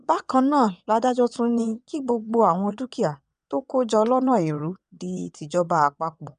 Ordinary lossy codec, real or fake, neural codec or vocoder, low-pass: none; fake; vocoder, 22.05 kHz, 80 mel bands, WaveNeXt; 9.9 kHz